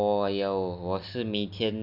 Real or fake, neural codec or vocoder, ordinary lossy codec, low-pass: real; none; none; 5.4 kHz